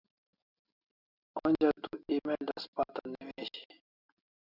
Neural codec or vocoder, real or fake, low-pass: none; real; 5.4 kHz